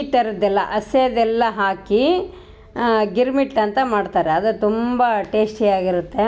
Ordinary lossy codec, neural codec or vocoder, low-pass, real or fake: none; none; none; real